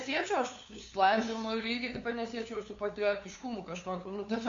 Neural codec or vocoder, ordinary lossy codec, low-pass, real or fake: codec, 16 kHz, 2 kbps, FunCodec, trained on LibriTTS, 25 frames a second; AAC, 48 kbps; 7.2 kHz; fake